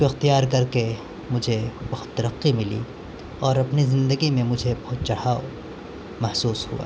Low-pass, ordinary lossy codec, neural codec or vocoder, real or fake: none; none; none; real